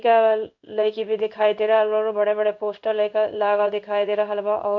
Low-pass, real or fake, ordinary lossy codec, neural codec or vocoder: 7.2 kHz; fake; none; codec, 16 kHz in and 24 kHz out, 1 kbps, XY-Tokenizer